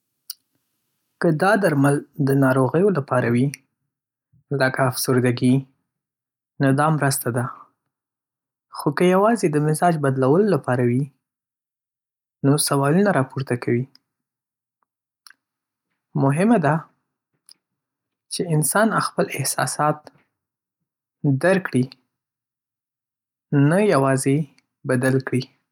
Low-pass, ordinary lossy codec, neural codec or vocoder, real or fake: 19.8 kHz; none; none; real